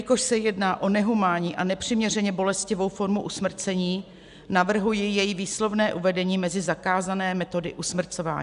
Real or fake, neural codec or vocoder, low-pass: real; none; 10.8 kHz